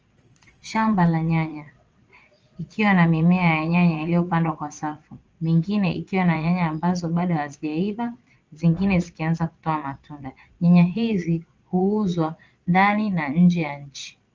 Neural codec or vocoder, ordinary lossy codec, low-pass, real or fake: vocoder, 22.05 kHz, 80 mel bands, Vocos; Opus, 24 kbps; 7.2 kHz; fake